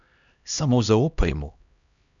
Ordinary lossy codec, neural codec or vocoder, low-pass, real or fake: none; codec, 16 kHz, 1 kbps, X-Codec, HuBERT features, trained on LibriSpeech; 7.2 kHz; fake